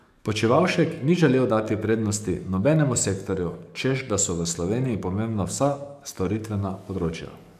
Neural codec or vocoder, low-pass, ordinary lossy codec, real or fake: codec, 44.1 kHz, 7.8 kbps, DAC; 14.4 kHz; none; fake